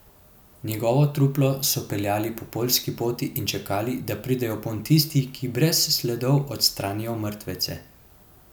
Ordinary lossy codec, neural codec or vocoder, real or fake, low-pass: none; none; real; none